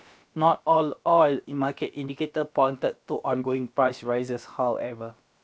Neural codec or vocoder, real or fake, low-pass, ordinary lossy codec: codec, 16 kHz, about 1 kbps, DyCAST, with the encoder's durations; fake; none; none